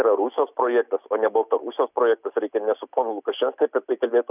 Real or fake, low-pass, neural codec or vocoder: real; 3.6 kHz; none